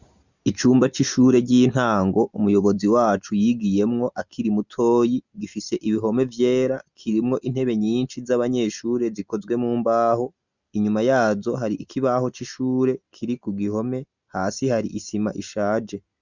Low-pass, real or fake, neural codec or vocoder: 7.2 kHz; real; none